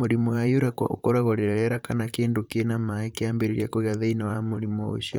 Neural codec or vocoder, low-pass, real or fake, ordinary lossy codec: vocoder, 44.1 kHz, 128 mel bands, Pupu-Vocoder; none; fake; none